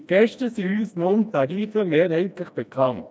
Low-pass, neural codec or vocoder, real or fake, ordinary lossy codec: none; codec, 16 kHz, 1 kbps, FreqCodec, smaller model; fake; none